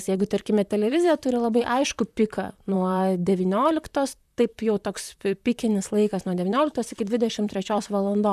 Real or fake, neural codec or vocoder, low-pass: fake; vocoder, 44.1 kHz, 128 mel bands, Pupu-Vocoder; 14.4 kHz